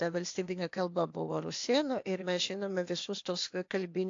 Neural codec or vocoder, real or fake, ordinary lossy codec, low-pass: codec, 16 kHz, 0.8 kbps, ZipCodec; fake; AAC, 48 kbps; 7.2 kHz